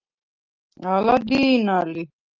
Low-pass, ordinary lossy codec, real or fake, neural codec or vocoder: 7.2 kHz; Opus, 32 kbps; real; none